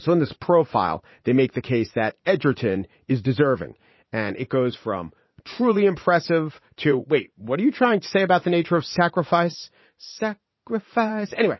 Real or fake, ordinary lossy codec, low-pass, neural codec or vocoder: fake; MP3, 24 kbps; 7.2 kHz; vocoder, 44.1 kHz, 80 mel bands, Vocos